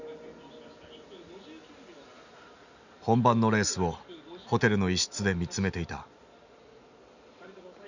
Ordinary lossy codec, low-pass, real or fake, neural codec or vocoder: none; 7.2 kHz; real; none